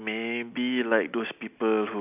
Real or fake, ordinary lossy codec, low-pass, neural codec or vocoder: real; none; 3.6 kHz; none